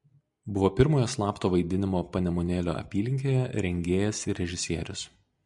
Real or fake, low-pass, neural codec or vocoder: real; 10.8 kHz; none